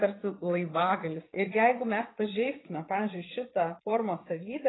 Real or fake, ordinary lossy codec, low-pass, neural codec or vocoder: fake; AAC, 16 kbps; 7.2 kHz; vocoder, 22.05 kHz, 80 mel bands, Vocos